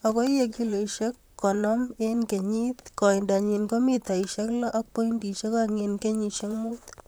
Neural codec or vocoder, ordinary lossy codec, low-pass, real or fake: vocoder, 44.1 kHz, 128 mel bands, Pupu-Vocoder; none; none; fake